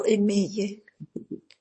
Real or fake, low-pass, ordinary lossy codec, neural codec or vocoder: fake; 10.8 kHz; MP3, 32 kbps; codec, 24 kHz, 0.9 kbps, WavTokenizer, small release